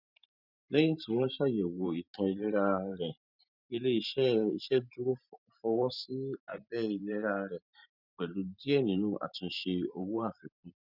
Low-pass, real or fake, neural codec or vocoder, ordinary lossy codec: 5.4 kHz; fake; vocoder, 44.1 kHz, 128 mel bands every 512 samples, BigVGAN v2; none